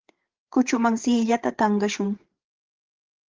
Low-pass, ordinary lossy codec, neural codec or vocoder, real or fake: 7.2 kHz; Opus, 16 kbps; codec, 16 kHz, 8 kbps, FreqCodec, larger model; fake